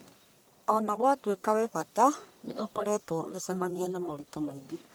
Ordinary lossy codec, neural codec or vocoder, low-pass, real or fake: none; codec, 44.1 kHz, 1.7 kbps, Pupu-Codec; none; fake